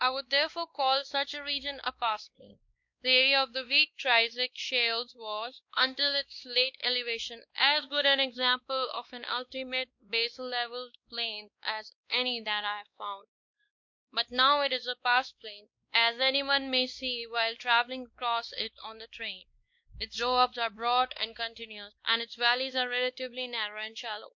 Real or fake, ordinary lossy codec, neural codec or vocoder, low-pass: fake; MP3, 48 kbps; codec, 16 kHz, 2 kbps, X-Codec, WavLM features, trained on Multilingual LibriSpeech; 7.2 kHz